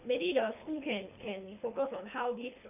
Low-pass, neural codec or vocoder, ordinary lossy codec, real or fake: 3.6 kHz; codec, 24 kHz, 3 kbps, HILCodec; none; fake